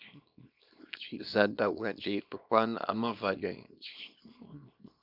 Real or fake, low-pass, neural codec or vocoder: fake; 5.4 kHz; codec, 24 kHz, 0.9 kbps, WavTokenizer, small release